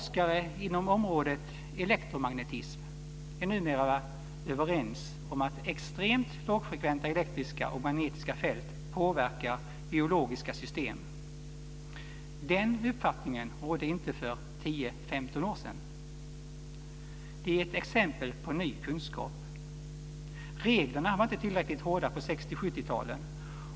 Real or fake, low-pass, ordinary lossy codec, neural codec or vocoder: real; none; none; none